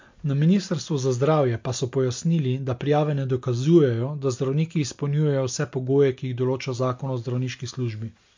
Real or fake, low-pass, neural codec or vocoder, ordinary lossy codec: real; 7.2 kHz; none; MP3, 48 kbps